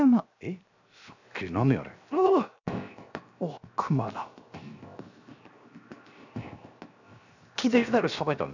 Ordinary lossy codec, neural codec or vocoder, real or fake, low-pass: none; codec, 16 kHz, 0.7 kbps, FocalCodec; fake; 7.2 kHz